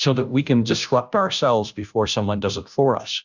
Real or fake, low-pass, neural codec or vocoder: fake; 7.2 kHz; codec, 16 kHz, 0.5 kbps, FunCodec, trained on Chinese and English, 25 frames a second